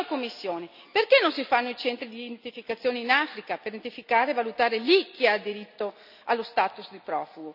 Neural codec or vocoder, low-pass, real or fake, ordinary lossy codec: none; 5.4 kHz; real; none